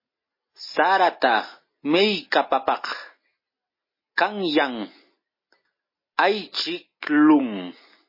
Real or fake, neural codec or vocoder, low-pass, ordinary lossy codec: real; none; 5.4 kHz; MP3, 24 kbps